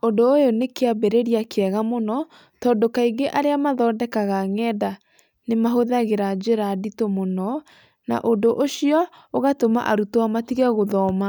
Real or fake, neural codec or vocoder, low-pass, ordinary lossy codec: real; none; none; none